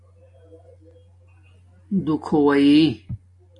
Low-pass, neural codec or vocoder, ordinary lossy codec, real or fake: 10.8 kHz; none; MP3, 48 kbps; real